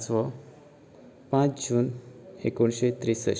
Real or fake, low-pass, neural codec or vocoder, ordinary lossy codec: real; none; none; none